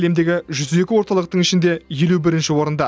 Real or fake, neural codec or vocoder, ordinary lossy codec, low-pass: real; none; none; none